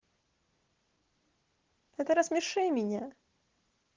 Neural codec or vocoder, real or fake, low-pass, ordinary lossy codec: none; real; 7.2 kHz; Opus, 16 kbps